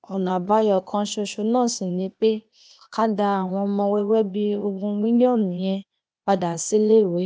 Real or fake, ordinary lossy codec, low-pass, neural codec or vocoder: fake; none; none; codec, 16 kHz, 0.8 kbps, ZipCodec